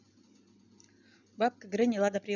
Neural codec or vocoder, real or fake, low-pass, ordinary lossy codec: none; real; 7.2 kHz; none